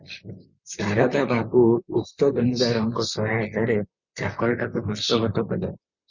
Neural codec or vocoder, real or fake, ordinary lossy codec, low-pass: vocoder, 44.1 kHz, 128 mel bands, Pupu-Vocoder; fake; Opus, 64 kbps; 7.2 kHz